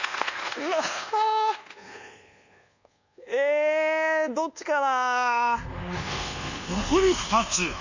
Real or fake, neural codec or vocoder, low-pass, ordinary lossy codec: fake; codec, 24 kHz, 1.2 kbps, DualCodec; 7.2 kHz; none